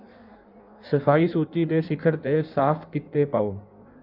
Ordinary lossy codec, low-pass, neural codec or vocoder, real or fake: none; 5.4 kHz; codec, 16 kHz in and 24 kHz out, 1.1 kbps, FireRedTTS-2 codec; fake